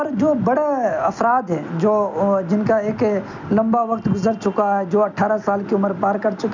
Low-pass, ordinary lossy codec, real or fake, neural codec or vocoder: 7.2 kHz; none; real; none